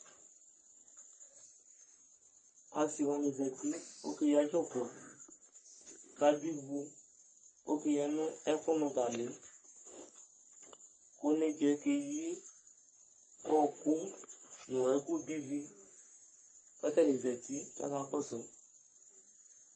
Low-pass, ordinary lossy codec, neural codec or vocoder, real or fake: 9.9 kHz; MP3, 32 kbps; codec, 44.1 kHz, 3.4 kbps, Pupu-Codec; fake